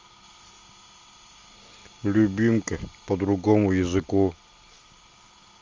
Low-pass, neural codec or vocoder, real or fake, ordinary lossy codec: 7.2 kHz; none; real; Opus, 32 kbps